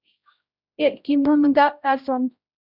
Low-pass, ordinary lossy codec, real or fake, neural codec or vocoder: 5.4 kHz; Opus, 64 kbps; fake; codec, 16 kHz, 0.5 kbps, X-Codec, HuBERT features, trained on general audio